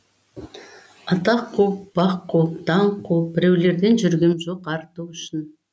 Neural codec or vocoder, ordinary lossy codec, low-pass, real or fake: none; none; none; real